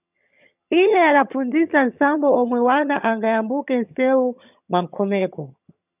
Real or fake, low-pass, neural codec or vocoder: fake; 3.6 kHz; vocoder, 22.05 kHz, 80 mel bands, HiFi-GAN